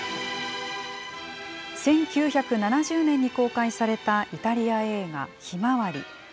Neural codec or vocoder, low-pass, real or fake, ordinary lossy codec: none; none; real; none